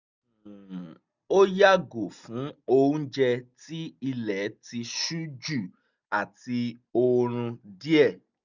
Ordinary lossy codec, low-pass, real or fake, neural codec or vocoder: none; 7.2 kHz; real; none